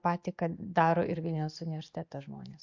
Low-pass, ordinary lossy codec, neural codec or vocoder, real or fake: 7.2 kHz; MP3, 48 kbps; none; real